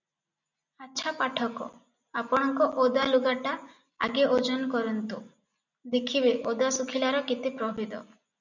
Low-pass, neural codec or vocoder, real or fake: 7.2 kHz; none; real